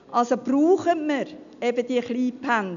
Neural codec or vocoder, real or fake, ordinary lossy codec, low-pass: none; real; none; 7.2 kHz